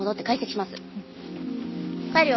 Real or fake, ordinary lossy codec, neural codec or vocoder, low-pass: real; MP3, 24 kbps; none; 7.2 kHz